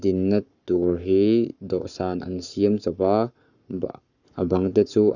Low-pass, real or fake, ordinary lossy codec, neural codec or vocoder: 7.2 kHz; fake; none; codec, 44.1 kHz, 7.8 kbps, Pupu-Codec